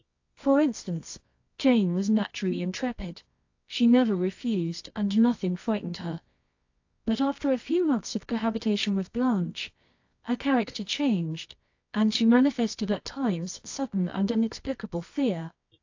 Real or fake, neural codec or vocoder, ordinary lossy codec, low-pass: fake; codec, 24 kHz, 0.9 kbps, WavTokenizer, medium music audio release; AAC, 48 kbps; 7.2 kHz